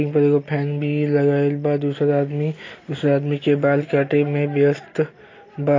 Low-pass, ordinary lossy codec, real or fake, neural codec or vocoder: 7.2 kHz; AAC, 32 kbps; real; none